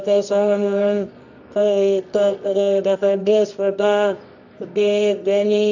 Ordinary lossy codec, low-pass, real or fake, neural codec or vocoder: none; 7.2 kHz; fake; codec, 24 kHz, 0.9 kbps, WavTokenizer, medium music audio release